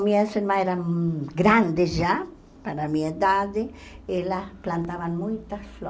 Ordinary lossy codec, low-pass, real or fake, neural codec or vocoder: none; none; real; none